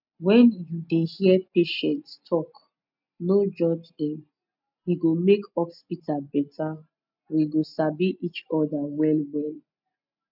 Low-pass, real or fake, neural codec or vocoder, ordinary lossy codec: 5.4 kHz; real; none; none